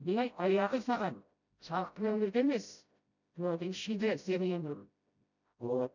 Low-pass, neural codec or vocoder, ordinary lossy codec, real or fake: 7.2 kHz; codec, 16 kHz, 0.5 kbps, FreqCodec, smaller model; none; fake